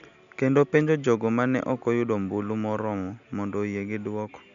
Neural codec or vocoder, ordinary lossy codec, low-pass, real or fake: none; none; 7.2 kHz; real